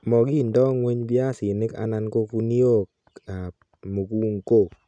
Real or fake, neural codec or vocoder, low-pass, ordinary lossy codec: real; none; 9.9 kHz; none